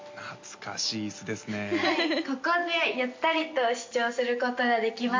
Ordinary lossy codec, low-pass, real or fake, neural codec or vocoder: MP3, 48 kbps; 7.2 kHz; real; none